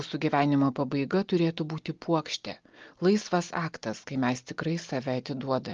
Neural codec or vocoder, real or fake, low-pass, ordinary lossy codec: none; real; 7.2 kHz; Opus, 32 kbps